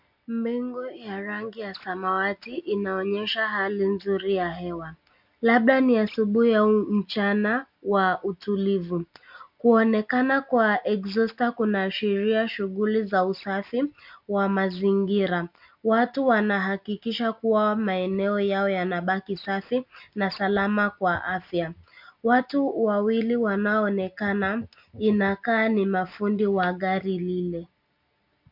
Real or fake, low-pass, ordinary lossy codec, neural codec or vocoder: real; 5.4 kHz; MP3, 48 kbps; none